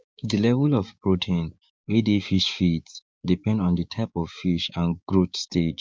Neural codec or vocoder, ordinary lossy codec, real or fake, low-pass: codec, 16 kHz, 6 kbps, DAC; none; fake; none